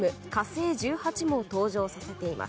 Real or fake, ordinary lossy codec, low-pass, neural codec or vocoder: real; none; none; none